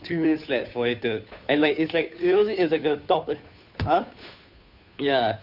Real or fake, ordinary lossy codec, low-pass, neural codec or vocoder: fake; none; 5.4 kHz; codec, 16 kHz, 2 kbps, FunCodec, trained on Chinese and English, 25 frames a second